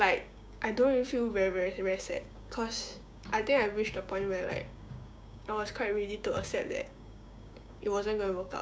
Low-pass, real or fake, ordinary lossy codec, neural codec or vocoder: none; fake; none; codec, 16 kHz, 6 kbps, DAC